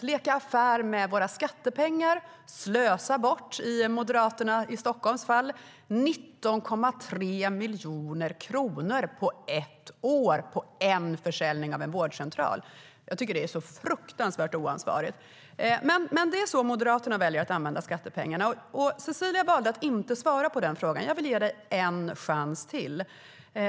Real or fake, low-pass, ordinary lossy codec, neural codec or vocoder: real; none; none; none